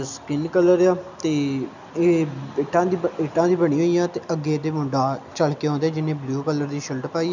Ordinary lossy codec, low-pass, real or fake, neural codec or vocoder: none; 7.2 kHz; real; none